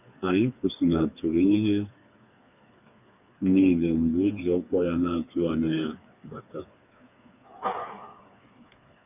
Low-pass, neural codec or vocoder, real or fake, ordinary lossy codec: 3.6 kHz; codec, 16 kHz, 4 kbps, FreqCodec, smaller model; fake; none